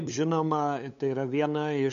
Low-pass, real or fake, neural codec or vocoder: 7.2 kHz; fake; codec, 16 kHz, 8 kbps, FunCodec, trained on LibriTTS, 25 frames a second